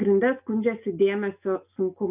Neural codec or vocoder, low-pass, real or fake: none; 3.6 kHz; real